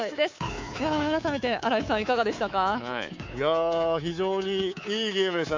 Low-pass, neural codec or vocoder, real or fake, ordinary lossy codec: 7.2 kHz; codec, 24 kHz, 3.1 kbps, DualCodec; fake; MP3, 64 kbps